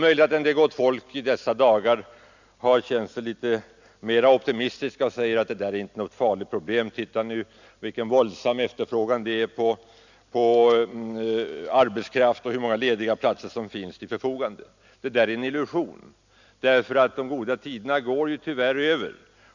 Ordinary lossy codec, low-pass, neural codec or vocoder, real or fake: none; 7.2 kHz; none; real